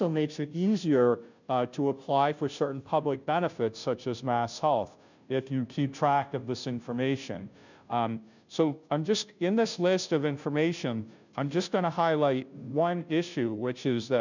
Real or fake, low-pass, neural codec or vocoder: fake; 7.2 kHz; codec, 16 kHz, 0.5 kbps, FunCodec, trained on Chinese and English, 25 frames a second